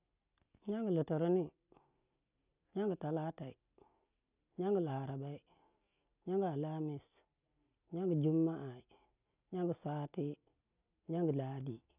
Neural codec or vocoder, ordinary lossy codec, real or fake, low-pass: none; none; real; 3.6 kHz